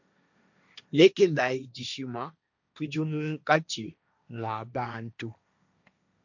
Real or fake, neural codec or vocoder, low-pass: fake; codec, 16 kHz, 1.1 kbps, Voila-Tokenizer; 7.2 kHz